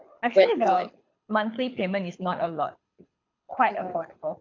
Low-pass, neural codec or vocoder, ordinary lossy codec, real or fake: 7.2 kHz; codec, 24 kHz, 6 kbps, HILCodec; AAC, 48 kbps; fake